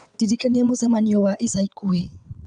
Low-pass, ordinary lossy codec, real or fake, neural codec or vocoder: 9.9 kHz; none; fake; vocoder, 22.05 kHz, 80 mel bands, WaveNeXt